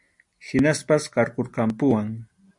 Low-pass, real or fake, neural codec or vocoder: 10.8 kHz; real; none